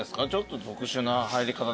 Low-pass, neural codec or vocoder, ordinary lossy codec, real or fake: none; none; none; real